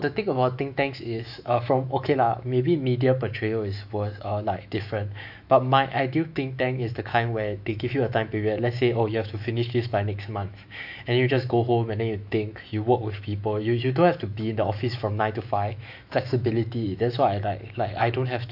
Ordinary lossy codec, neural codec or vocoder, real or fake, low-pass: AAC, 48 kbps; autoencoder, 48 kHz, 128 numbers a frame, DAC-VAE, trained on Japanese speech; fake; 5.4 kHz